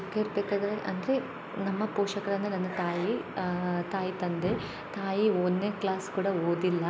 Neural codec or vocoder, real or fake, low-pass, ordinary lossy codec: none; real; none; none